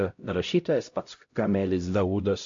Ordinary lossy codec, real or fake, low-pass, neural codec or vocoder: MP3, 48 kbps; fake; 7.2 kHz; codec, 16 kHz, 0.5 kbps, X-Codec, HuBERT features, trained on LibriSpeech